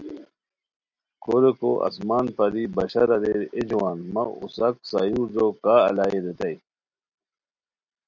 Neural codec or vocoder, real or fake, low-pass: none; real; 7.2 kHz